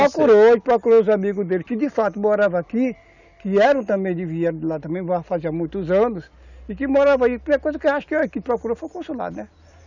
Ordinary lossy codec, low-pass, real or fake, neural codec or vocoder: none; 7.2 kHz; real; none